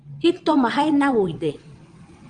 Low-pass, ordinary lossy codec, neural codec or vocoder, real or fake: 9.9 kHz; Opus, 32 kbps; vocoder, 22.05 kHz, 80 mel bands, WaveNeXt; fake